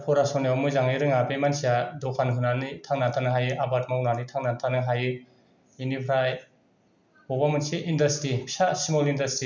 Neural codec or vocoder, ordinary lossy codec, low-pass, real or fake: none; none; 7.2 kHz; real